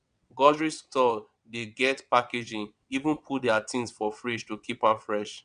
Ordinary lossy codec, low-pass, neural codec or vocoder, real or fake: none; 9.9 kHz; vocoder, 22.05 kHz, 80 mel bands, WaveNeXt; fake